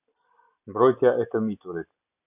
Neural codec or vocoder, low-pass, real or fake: none; 3.6 kHz; real